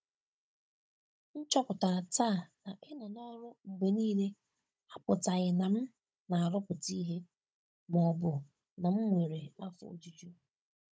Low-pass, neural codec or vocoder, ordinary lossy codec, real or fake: none; codec, 16 kHz, 16 kbps, FunCodec, trained on Chinese and English, 50 frames a second; none; fake